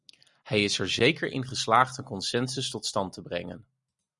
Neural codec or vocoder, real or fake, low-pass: none; real; 10.8 kHz